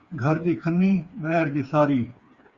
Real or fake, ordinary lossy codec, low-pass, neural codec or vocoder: fake; Opus, 64 kbps; 7.2 kHz; codec, 16 kHz, 4 kbps, FreqCodec, smaller model